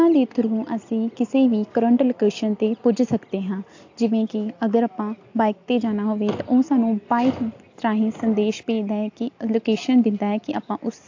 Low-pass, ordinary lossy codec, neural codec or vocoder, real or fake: 7.2 kHz; AAC, 48 kbps; none; real